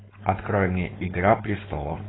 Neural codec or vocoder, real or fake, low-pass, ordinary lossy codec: codec, 16 kHz, 4.8 kbps, FACodec; fake; 7.2 kHz; AAC, 16 kbps